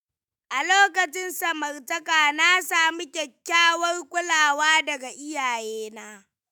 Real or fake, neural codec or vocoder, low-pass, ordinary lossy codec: fake; autoencoder, 48 kHz, 128 numbers a frame, DAC-VAE, trained on Japanese speech; none; none